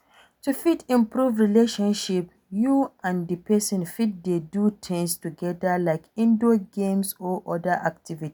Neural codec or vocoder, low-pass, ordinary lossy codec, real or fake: none; none; none; real